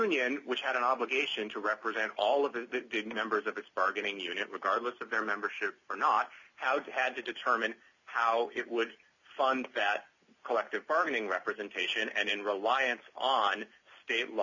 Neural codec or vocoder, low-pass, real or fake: none; 7.2 kHz; real